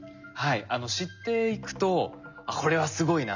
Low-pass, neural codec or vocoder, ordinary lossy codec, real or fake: 7.2 kHz; none; none; real